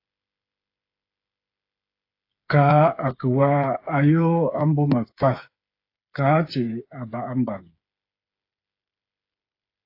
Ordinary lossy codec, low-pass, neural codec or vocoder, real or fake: AAC, 32 kbps; 5.4 kHz; codec, 16 kHz, 8 kbps, FreqCodec, smaller model; fake